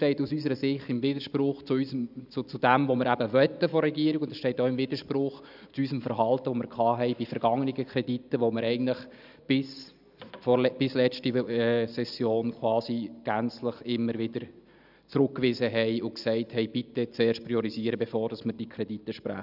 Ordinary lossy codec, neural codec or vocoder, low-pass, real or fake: none; none; 5.4 kHz; real